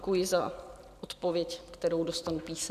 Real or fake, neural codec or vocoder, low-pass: real; none; 14.4 kHz